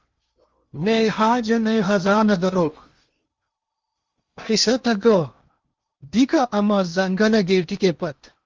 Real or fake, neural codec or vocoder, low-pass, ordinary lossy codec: fake; codec, 16 kHz in and 24 kHz out, 0.8 kbps, FocalCodec, streaming, 65536 codes; 7.2 kHz; Opus, 32 kbps